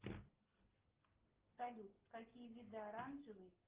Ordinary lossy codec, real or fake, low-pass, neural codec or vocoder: Opus, 16 kbps; real; 3.6 kHz; none